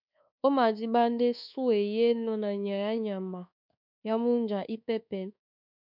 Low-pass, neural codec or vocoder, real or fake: 5.4 kHz; codec, 24 kHz, 1.2 kbps, DualCodec; fake